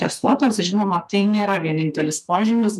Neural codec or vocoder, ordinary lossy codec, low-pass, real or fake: codec, 32 kHz, 1.9 kbps, SNAC; MP3, 96 kbps; 14.4 kHz; fake